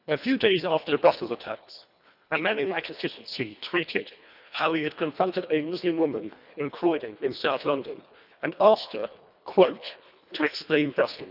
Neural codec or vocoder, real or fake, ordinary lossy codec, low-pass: codec, 24 kHz, 1.5 kbps, HILCodec; fake; none; 5.4 kHz